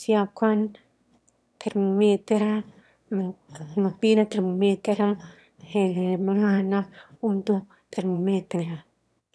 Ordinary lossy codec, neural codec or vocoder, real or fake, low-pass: none; autoencoder, 22.05 kHz, a latent of 192 numbers a frame, VITS, trained on one speaker; fake; none